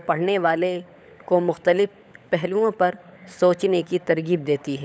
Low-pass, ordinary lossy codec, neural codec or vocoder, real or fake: none; none; codec, 16 kHz, 16 kbps, FunCodec, trained on LibriTTS, 50 frames a second; fake